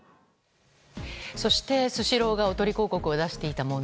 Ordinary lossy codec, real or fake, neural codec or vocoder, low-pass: none; real; none; none